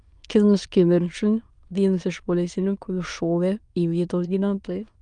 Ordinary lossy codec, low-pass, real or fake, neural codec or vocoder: Opus, 32 kbps; 9.9 kHz; fake; autoencoder, 22.05 kHz, a latent of 192 numbers a frame, VITS, trained on many speakers